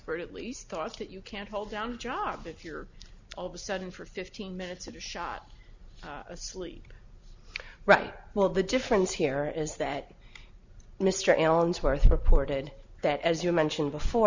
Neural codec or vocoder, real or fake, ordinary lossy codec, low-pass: none; real; Opus, 64 kbps; 7.2 kHz